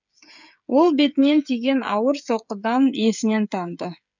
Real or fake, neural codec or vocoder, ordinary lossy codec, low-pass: fake; codec, 16 kHz, 8 kbps, FreqCodec, smaller model; none; 7.2 kHz